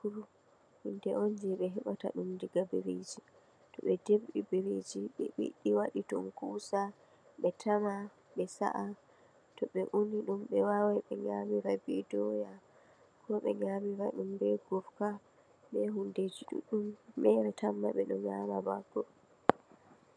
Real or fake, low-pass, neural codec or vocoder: real; 9.9 kHz; none